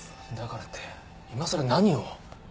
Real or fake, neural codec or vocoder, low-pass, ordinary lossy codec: real; none; none; none